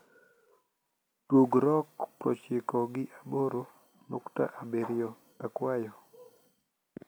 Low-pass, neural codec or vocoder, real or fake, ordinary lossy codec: none; none; real; none